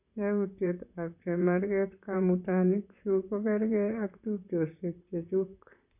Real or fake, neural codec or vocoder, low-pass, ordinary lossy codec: fake; vocoder, 44.1 kHz, 128 mel bands, Pupu-Vocoder; 3.6 kHz; none